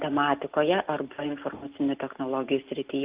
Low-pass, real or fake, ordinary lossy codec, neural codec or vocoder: 3.6 kHz; real; Opus, 24 kbps; none